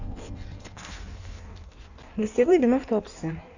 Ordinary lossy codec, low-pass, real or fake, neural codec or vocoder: none; 7.2 kHz; fake; codec, 16 kHz in and 24 kHz out, 1.1 kbps, FireRedTTS-2 codec